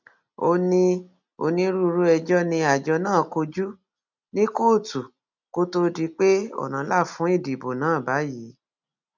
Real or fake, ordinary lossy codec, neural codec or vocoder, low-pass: real; none; none; 7.2 kHz